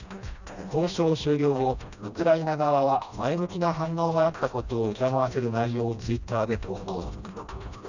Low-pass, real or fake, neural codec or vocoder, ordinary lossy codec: 7.2 kHz; fake; codec, 16 kHz, 1 kbps, FreqCodec, smaller model; none